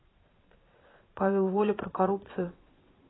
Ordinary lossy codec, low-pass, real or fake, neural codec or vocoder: AAC, 16 kbps; 7.2 kHz; fake; vocoder, 22.05 kHz, 80 mel bands, Vocos